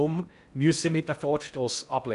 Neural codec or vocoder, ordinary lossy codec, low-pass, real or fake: codec, 16 kHz in and 24 kHz out, 0.6 kbps, FocalCodec, streaming, 4096 codes; none; 10.8 kHz; fake